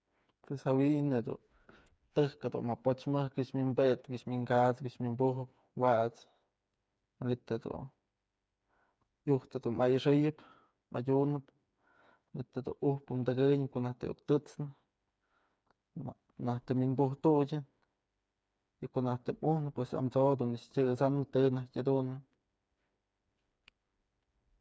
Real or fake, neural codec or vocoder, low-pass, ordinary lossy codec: fake; codec, 16 kHz, 4 kbps, FreqCodec, smaller model; none; none